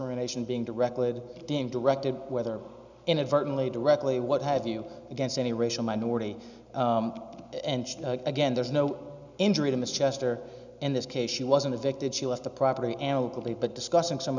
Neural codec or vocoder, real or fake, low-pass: none; real; 7.2 kHz